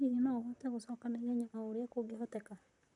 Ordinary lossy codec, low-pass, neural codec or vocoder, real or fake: none; 10.8 kHz; vocoder, 44.1 kHz, 128 mel bands, Pupu-Vocoder; fake